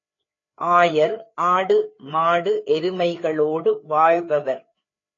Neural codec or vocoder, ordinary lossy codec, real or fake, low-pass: codec, 16 kHz, 4 kbps, FreqCodec, larger model; AAC, 32 kbps; fake; 7.2 kHz